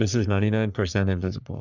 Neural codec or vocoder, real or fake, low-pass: codec, 44.1 kHz, 3.4 kbps, Pupu-Codec; fake; 7.2 kHz